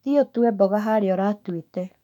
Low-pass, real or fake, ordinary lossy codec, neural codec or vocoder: 19.8 kHz; fake; none; autoencoder, 48 kHz, 128 numbers a frame, DAC-VAE, trained on Japanese speech